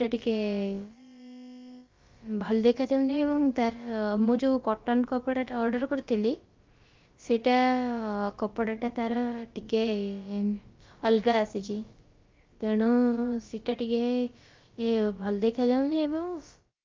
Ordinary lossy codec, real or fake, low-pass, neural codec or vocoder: Opus, 24 kbps; fake; 7.2 kHz; codec, 16 kHz, about 1 kbps, DyCAST, with the encoder's durations